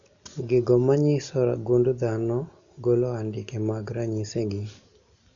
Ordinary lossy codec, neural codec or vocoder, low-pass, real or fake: none; none; 7.2 kHz; real